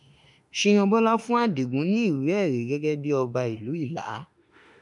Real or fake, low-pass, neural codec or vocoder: fake; 10.8 kHz; autoencoder, 48 kHz, 32 numbers a frame, DAC-VAE, trained on Japanese speech